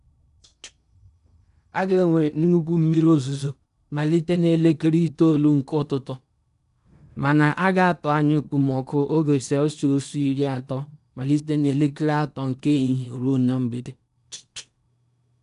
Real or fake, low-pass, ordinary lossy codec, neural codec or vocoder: fake; 10.8 kHz; none; codec, 16 kHz in and 24 kHz out, 0.8 kbps, FocalCodec, streaming, 65536 codes